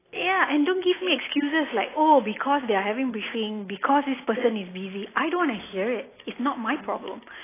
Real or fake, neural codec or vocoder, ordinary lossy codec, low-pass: real; none; AAC, 16 kbps; 3.6 kHz